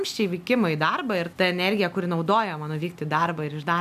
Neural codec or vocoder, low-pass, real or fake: none; 14.4 kHz; real